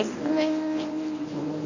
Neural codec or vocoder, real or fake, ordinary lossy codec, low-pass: codec, 24 kHz, 0.9 kbps, WavTokenizer, medium speech release version 1; fake; none; 7.2 kHz